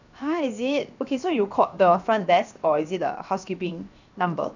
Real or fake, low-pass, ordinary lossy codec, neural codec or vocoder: fake; 7.2 kHz; none; codec, 16 kHz, 0.7 kbps, FocalCodec